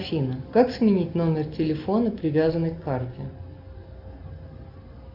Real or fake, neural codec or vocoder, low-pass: real; none; 5.4 kHz